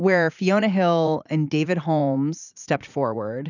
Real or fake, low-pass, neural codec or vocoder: fake; 7.2 kHz; vocoder, 44.1 kHz, 80 mel bands, Vocos